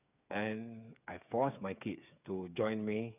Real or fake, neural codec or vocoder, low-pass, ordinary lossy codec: fake; codec, 16 kHz, 8 kbps, FreqCodec, smaller model; 3.6 kHz; none